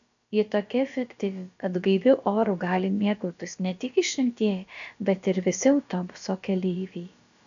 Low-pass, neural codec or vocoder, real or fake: 7.2 kHz; codec, 16 kHz, about 1 kbps, DyCAST, with the encoder's durations; fake